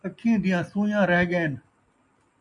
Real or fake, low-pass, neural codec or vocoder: real; 10.8 kHz; none